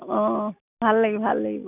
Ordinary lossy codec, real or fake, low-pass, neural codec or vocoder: none; real; 3.6 kHz; none